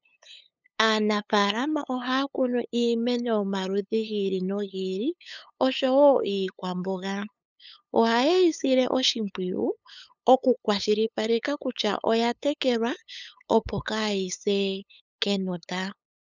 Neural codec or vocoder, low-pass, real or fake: codec, 16 kHz, 8 kbps, FunCodec, trained on LibriTTS, 25 frames a second; 7.2 kHz; fake